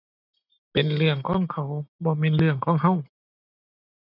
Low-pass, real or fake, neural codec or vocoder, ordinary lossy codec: 5.4 kHz; real; none; none